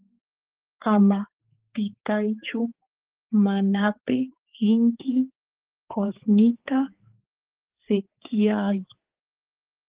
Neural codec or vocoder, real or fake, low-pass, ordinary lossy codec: codec, 16 kHz, 4 kbps, X-Codec, HuBERT features, trained on balanced general audio; fake; 3.6 kHz; Opus, 16 kbps